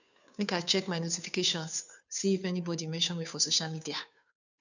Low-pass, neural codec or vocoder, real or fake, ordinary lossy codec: 7.2 kHz; codec, 16 kHz, 2 kbps, FunCodec, trained on Chinese and English, 25 frames a second; fake; none